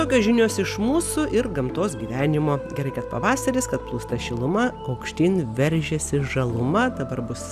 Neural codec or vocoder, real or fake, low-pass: none; real; 14.4 kHz